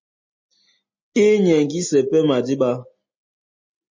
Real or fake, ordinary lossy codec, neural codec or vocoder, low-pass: real; MP3, 32 kbps; none; 7.2 kHz